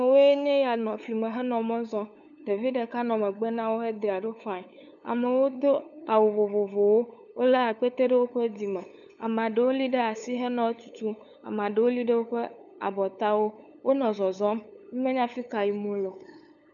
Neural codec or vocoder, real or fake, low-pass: codec, 16 kHz, 8 kbps, FunCodec, trained on LibriTTS, 25 frames a second; fake; 7.2 kHz